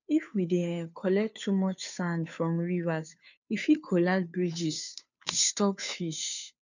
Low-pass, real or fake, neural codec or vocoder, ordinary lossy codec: 7.2 kHz; fake; codec, 16 kHz, 2 kbps, FunCodec, trained on Chinese and English, 25 frames a second; none